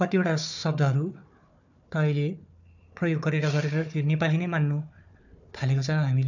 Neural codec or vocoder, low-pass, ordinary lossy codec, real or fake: codec, 16 kHz, 4 kbps, FunCodec, trained on Chinese and English, 50 frames a second; 7.2 kHz; none; fake